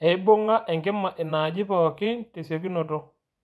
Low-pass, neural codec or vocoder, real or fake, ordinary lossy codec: none; vocoder, 24 kHz, 100 mel bands, Vocos; fake; none